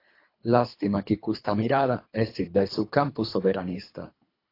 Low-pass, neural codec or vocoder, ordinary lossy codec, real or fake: 5.4 kHz; codec, 24 kHz, 3 kbps, HILCodec; AAC, 32 kbps; fake